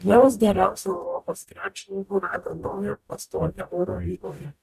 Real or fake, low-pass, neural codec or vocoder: fake; 14.4 kHz; codec, 44.1 kHz, 0.9 kbps, DAC